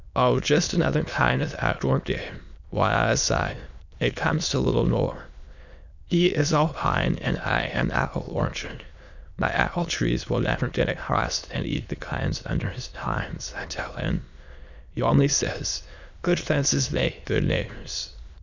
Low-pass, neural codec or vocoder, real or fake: 7.2 kHz; autoencoder, 22.05 kHz, a latent of 192 numbers a frame, VITS, trained on many speakers; fake